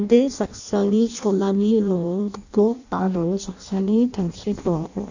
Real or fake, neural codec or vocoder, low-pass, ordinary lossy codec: fake; codec, 16 kHz in and 24 kHz out, 0.6 kbps, FireRedTTS-2 codec; 7.2 kHz; none